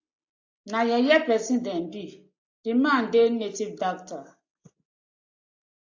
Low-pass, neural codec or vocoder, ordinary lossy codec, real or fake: 7.2 kHz; none; AAC, 48 kbps; real